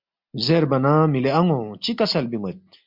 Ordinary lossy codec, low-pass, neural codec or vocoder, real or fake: MP3, 48 kbps; 5.4 kHz; none; real